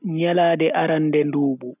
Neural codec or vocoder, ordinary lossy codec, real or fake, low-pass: none; none; real; 3.6 kHz